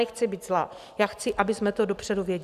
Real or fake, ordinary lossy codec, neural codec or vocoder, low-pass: real; Opus, 64 kbps; none; 14.4 kHz